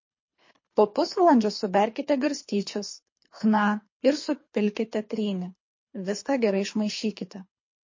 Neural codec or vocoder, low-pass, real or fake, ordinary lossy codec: codec, 24 kHz, 3 kbps, HILCodec; 7.2 kHz; fake; MP3, 32 kbps